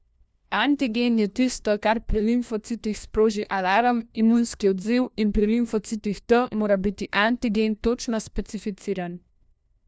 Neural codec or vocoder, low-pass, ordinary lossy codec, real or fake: codec, 16 kHz, 1 kbps, FunCodec, trained on LibriTTS, 50 frames a second; none; none; fake